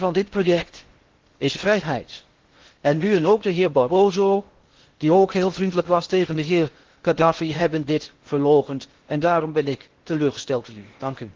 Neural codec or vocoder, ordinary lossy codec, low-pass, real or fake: codec, 16 kHz in and 24 kHz out, 0.6 kbps, FocalCodec, streaming, 4096 codes; Opus, 16 kbps; 7.2 kHz; fake